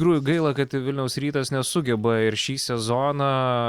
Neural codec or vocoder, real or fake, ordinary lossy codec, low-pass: none; real; Opus, 64 kbps; 19.8 kHz